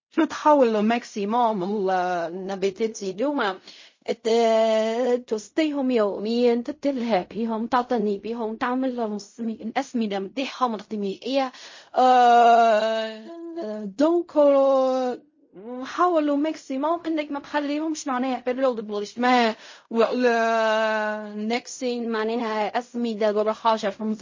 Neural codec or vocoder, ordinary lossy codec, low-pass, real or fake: codec, 16 kHz in and 24 kHz out, 0.4 kbps, LongCat-Audio-Codec, fine tuned four codebook decoder; MP3, 32 kbps; 7.2 kHz; fake